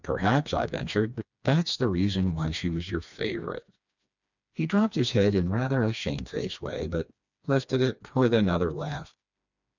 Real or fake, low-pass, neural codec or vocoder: fake; 7.2 kHz; codec, 16 kHz, 2 kbps, FreqCodec, smaller model